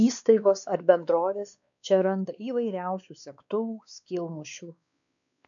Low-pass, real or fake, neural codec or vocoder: 7.2 kHz; fake; codec, 16 kHz, 2 kbps, X-Codec, WavLM features, trained on Multilingual LibriSpeech